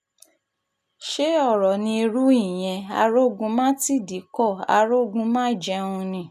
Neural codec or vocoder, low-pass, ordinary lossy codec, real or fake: none; 14.4 kHz; none; real